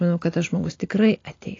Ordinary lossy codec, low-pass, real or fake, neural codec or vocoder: AAC, 32 kbps; 7.2 kHz; real; none